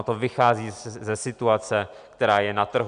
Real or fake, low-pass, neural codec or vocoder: real; 9.9 kHz; none